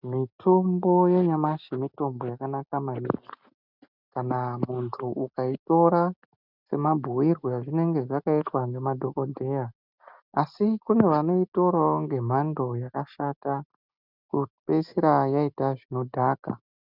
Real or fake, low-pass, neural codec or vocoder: real; 5.4 kHz; none